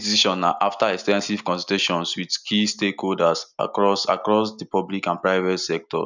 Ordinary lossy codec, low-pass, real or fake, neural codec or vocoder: none; 7.2 kHz; real; none